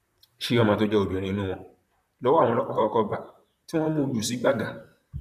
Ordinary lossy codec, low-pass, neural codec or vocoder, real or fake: none; 14.4 kHz; vocoder, 44.1 kHz, 128 mel bands, Pupu-Vocoder; fake